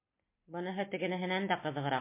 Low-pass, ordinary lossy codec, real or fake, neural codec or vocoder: 3.6 kHz; MP3, 24 kbps; real; none